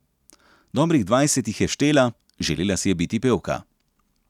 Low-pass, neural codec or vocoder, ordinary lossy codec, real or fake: 19.8 kHz; none; none; real